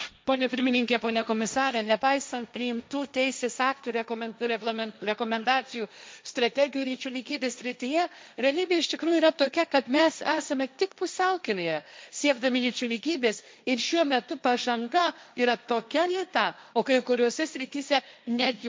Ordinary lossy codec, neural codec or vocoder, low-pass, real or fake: none; codec, 16 kHz, 1.1 kbps, Voila-Tokenizer; none; fake